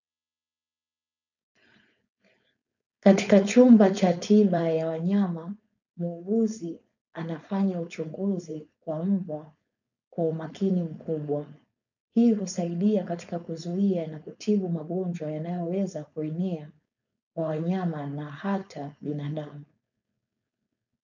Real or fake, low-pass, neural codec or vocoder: fake; 7.2 kHz; codec, 16 kHz, 4.8 kbps, FACodec